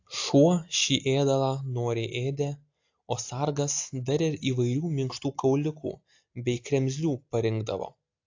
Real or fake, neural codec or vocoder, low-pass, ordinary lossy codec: real; none; 7.2 kHz; AAC, 48 kbps